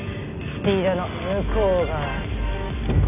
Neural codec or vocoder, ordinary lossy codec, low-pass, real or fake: none; none; 3.6 kHz; real